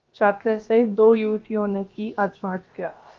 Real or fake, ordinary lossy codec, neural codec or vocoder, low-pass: fake; Opus, 24 kbps; codec, 16 kHz, about 1 kbps, DyCAST, with the encoder's durations; 7.2 kHz